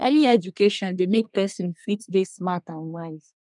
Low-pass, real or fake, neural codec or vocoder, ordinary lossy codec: 10.8 kHz; fake; codec, 24 kHz, 1 kbps, SNAC; none